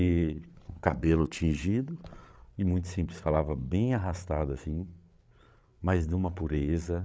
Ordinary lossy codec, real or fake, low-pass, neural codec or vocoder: none; fake; none; codec, 16 kHz, 8 kbps, FreqCodec, larger model